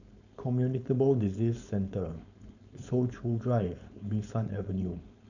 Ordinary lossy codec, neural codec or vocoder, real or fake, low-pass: none; codec, 16 kHz, 4.8 kbps, FACodec; fake; 7.2 kHz